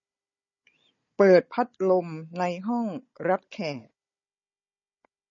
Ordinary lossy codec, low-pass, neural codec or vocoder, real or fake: MP3, 32 kbps; 7.2 kHz; codec, 16 kHz, 16 kbps, FunCodec, trained on Chinese and English, 50 frames a second; fake